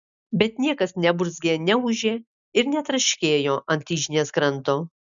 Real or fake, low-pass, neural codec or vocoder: real; 7.2 kHz; none